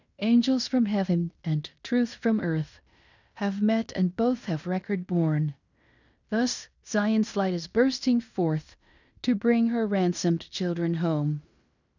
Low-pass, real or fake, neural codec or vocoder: 7.2 kHz; fake; codec, 16 kHz in and 24 kHz out, 0.9 kbps, LongCat-Audio-Codec, fine tuned four codebook decoder